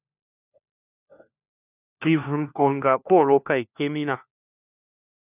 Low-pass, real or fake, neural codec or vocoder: 3.6 kHz; fake; codec, 16 kHz, 1 kbps, FunCodec, trained on LibriTTS, 50 frames a second